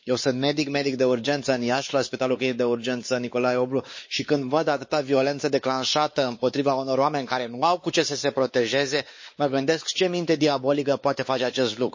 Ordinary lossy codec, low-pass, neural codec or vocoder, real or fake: MP3, 32 kbps; 7.2 kHz; codec, 16 kHz, 4 kbps, X-Codec, WavLM features, trained on Multilingual LibriSpeech; fake